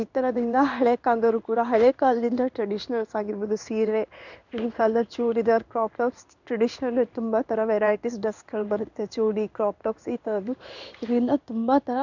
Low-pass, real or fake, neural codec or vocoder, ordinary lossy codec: 7.2 kHz; fake; codec, 16 kHz in and 24 kHz out, 1 kbps, XY-Tokenizer; none